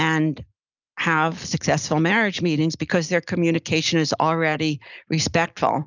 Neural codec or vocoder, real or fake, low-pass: none; real; 7.2 kHz